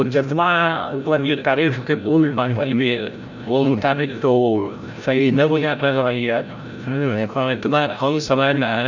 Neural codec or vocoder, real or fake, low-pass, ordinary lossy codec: codec, 16 kHz, 0.5 kbps, FreqCodec, larger model; fake; 7.2 kHz; none